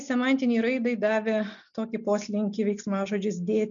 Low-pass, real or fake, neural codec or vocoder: 7.2 kHz; real; none